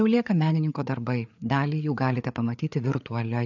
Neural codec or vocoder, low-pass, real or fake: codec, 16 kHz, 4 kbps, FreqCodec, larger model; 7.2 kHz; fake